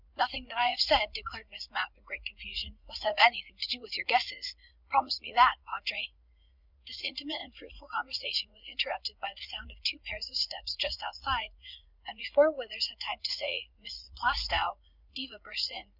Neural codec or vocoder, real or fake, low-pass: vocoder, 44.1 kHz, 80 mel bands, Vocos; fake; 5.4 kHz